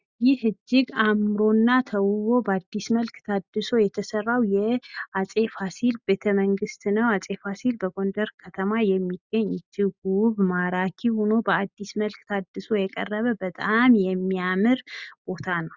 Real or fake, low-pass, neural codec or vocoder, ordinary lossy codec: real; 7.2 kHz; none; Opus, 64 kbps